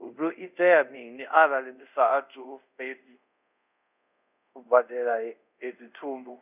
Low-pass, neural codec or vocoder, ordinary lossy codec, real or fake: 3.6 kHz; codec, 24 kHz, 0.5 kbps, DualCodec; none; fake